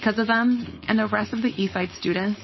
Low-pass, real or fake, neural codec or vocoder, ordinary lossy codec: 7.2 kHz; fake; codec, 16 kHz, 4.8 kbps, FACodec; MP3, 24 kbps